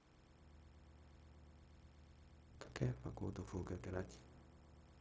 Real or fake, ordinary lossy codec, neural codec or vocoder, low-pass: fake; none; codec, 16 kHz, 0.4 kbps, LongCat-Audio-Codec; none